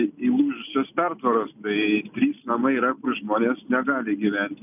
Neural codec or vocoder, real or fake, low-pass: none; real; 3.6 kHz